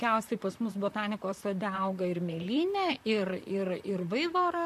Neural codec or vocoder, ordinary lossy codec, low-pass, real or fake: vocoder, 44.1 kHz, 128 mel bands, Pupu-Vocoder; MP3, 64 kbps; 14.4 kHz; fake